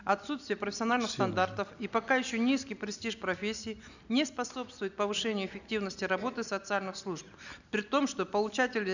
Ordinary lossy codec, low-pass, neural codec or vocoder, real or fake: none; 7.2 kHz; none; real